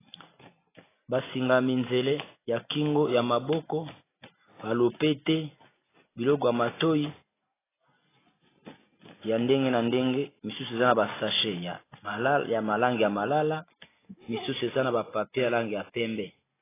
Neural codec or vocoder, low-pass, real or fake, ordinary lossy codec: none; 3.6 kHz; real; AAC, 16 kbps